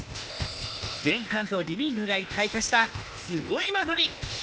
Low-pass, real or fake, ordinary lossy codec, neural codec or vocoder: none; fake; none; codec, 16 kHz, 0.8 kbps, ZipCodec